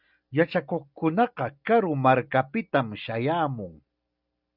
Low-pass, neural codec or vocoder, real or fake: 5.4 kHz; none; real